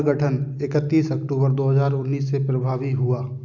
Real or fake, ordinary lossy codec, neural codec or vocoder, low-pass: real; none; none; 7.2 kHz